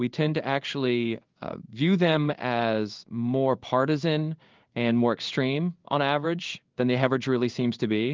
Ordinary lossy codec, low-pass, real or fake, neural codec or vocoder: Opus, 32 kbps; 7.2 kHz; fake; codec, 16 kHz in and 24 kHz out, 1 kbps, XY-Tokenizer